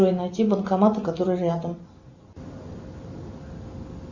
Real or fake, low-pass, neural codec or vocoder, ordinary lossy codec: real; 7.2 kHz; none; Opus, 64 kbps